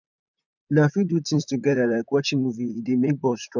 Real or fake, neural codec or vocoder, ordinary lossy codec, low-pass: fake; vocoder, 44.1 kHz, 128 mel bands, Pupu-Vocoder; none; 7.2 kHz